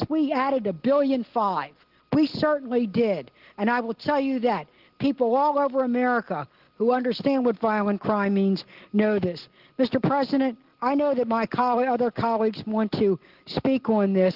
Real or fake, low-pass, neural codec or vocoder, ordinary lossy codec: real; 5.4 kHz; none; Opus, 16 kbps